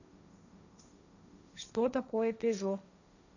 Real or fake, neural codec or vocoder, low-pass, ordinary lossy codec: fake; codec, 16 kHz, 1.1 kbps, Voila-Tokenizer; 7.2 kHz; none